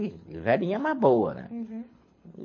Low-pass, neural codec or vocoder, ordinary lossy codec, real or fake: 7.2 kHz; codec, 24 kHz, 6 kbps, HILCodec; MP3, 32 kbps; fake